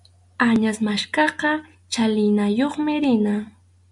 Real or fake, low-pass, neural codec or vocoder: real; 10.8 kHz; none